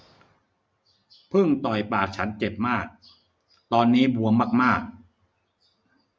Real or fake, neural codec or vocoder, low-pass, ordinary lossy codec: real; none; none; none